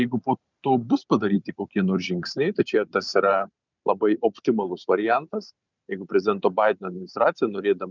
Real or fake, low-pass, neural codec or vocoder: fake; 7.2 kHz; vocoder, 44.1 kHz, 128 mel bands every 512 samples, BigVGAN v2